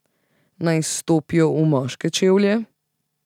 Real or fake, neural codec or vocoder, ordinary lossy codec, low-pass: real; none; none; 19.8 kHz